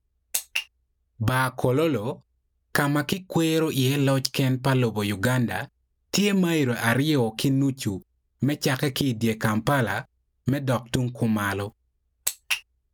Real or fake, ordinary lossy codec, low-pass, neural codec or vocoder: real; none; none; none